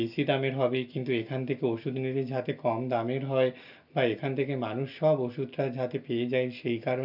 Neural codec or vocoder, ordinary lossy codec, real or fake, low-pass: none; none; real; 5.4 kHz